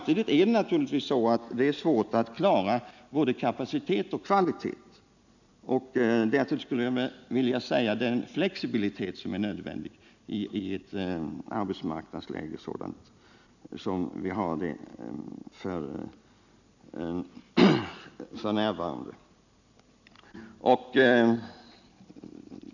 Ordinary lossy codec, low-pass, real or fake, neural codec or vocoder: none; 7.2 kHz; real; none